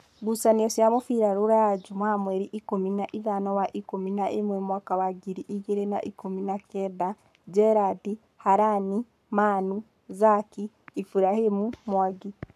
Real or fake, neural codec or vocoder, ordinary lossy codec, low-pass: fake; codec, 44.1 kHz, 7.8 kbps, Pupu-Codec; none; 14.4 kHz